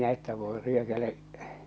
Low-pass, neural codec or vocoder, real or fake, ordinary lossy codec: none; none; real; none